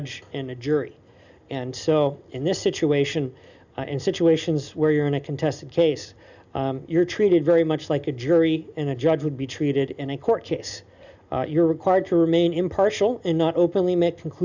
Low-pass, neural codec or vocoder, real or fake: 7.2 kHz; none; real